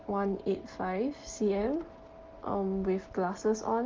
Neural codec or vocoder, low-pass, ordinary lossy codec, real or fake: none; 7.2 kHz; Opus, 16 kbps; real